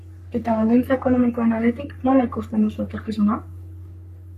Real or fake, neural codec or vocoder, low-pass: fake; codec, 44.1 kHz, 3.4 kbps, Pupu-Codec; 14.4 kHz